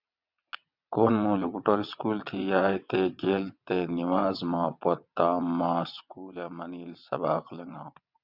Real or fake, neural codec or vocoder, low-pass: fake; vocoder, 22.05 kHz, 80 mel bands, WaveNeXt; 5.4 kHz